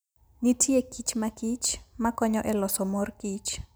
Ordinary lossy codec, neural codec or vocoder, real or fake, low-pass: none; none; real; none